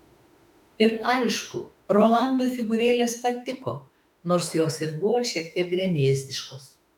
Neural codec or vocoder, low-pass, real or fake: autoencoder, 48 kHz, 32 numbers a frame, DAC-VAE, trained on Japanese speech; 19.8 kHz; fake